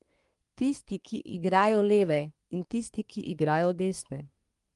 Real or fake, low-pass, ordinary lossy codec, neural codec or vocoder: fake; 10.8 kHz; Opus, 32 kbps; codec, 24 kHz, 1 kbps, SNAC